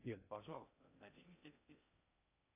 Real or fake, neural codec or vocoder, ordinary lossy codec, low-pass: fake; codec, 16 kHz in and 24 kHz out, 0.6 kbps, FocalCodec, streaming, 2048 codes; AAC, 32 kbps; 3.6 kHz